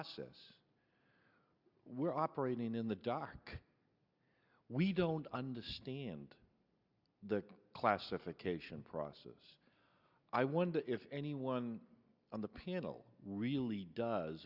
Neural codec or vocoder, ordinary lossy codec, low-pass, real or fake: none; Opus, 64 kbps; 5.4 kHz; real